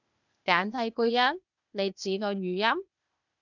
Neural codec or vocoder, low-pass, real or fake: codec, 16 kHz, 0.8 kbps, ZipCodec; 7.2 kHz; fake